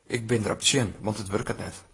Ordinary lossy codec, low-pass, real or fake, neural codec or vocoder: AAC, 32 kbps; 10.8 kHz; fake; vocoder, 44.1 kHz, 128 mel bands, Pupu-Vocoder